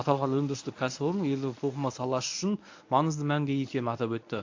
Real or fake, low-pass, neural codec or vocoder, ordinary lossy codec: fake; 7.2 kHz; codec, 24 kHz, 0.9 kbps, WavTokenizer, medium speech release version 1; AAC, 48 kbps